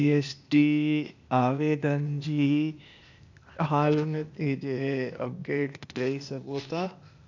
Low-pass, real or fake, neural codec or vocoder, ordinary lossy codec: 7.2 kHz; fake; codec, 16 kHz, 0.8 kbps, ZipCodec; none